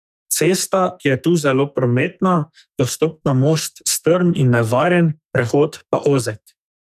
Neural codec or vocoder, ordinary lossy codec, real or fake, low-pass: codec, 44.1 kHz, 2.6 kbps, SNAC; none; fake; 14.4 kHz